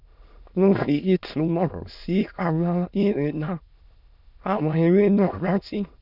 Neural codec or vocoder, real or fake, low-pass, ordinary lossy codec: autoencoder, 22.05 kHz, a latent of 192 numbers a frame, VITS, trained on many speakers; fake; 5.4 kHz; none